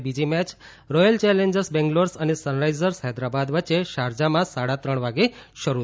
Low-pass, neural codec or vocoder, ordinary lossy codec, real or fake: none; none; none; real